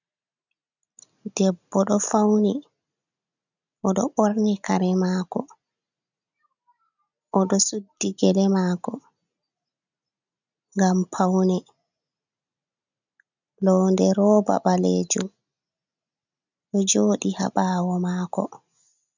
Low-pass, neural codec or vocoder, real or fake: 7.2 kHz; none; real